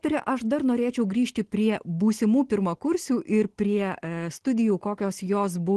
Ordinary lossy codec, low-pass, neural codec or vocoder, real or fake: Opus, 16 kbps; 10.8 kHz; none; real